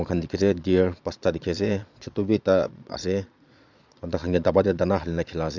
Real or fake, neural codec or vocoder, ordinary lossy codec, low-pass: fake; vocoder, 22.05 kHz, 80 mel bands, Vocos; none; 7.2 kHz